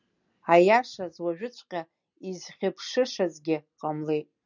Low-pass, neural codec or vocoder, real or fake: 7.2 kHz; none; real